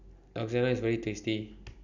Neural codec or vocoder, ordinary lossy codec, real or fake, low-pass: none; none; real; 7.2 kHz